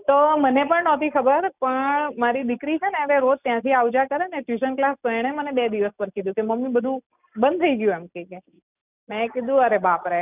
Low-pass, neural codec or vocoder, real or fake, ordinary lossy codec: 3.6 kHz; none; real; none